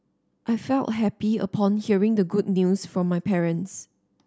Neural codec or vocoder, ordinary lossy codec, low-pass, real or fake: none; none; none; real